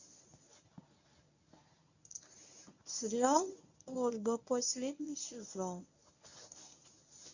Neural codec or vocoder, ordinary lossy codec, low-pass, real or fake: codec, 24 kHz, 0.9 kbps, WavTokenizer, medium speech release version 1; none; 7.2 kHz; fake